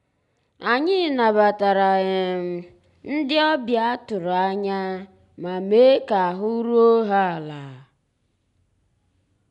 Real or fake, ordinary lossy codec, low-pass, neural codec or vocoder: real; none; 10.8 kHz; none